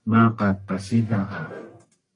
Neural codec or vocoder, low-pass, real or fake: codec, 44.1 kHz, 1.7 kbps, Pupu-Codec; 10.8 kHz; fake